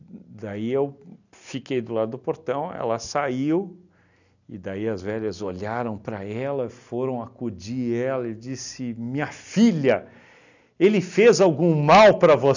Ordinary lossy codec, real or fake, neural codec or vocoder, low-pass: none; real; none; 7.2 kHz